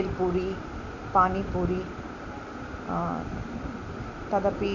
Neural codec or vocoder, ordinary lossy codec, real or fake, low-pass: none; none; real; 7.2 kHz